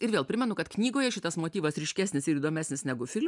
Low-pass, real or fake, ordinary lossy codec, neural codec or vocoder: 10.8 kHz; real; MP3, 96 kbps; none